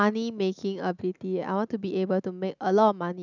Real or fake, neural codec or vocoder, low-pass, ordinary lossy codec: real; none; 7.2 kHz; none